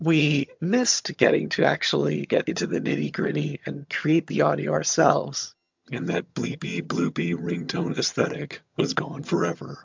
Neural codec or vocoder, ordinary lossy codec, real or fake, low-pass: vocoder, 22.05 kHz, 80 mel bands, HiFi-GAN; MP3, 64 kbps; fake; 7.2 kHz